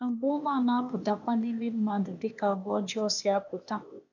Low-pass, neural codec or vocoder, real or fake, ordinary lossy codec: 7.2 kHz; codec, 16 kHz, 0.8 kbps, ZipCodec; fake; none